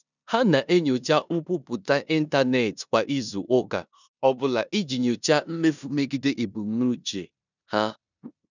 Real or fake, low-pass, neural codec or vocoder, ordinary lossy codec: fake; 7.2 kHz; codec, 16 kHz in and 24 kHz out, 0.9 kbps, LongCat-Audio-Codec, four codebook decoder; none